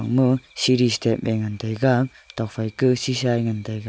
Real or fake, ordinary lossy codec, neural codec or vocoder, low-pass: real; none; none; none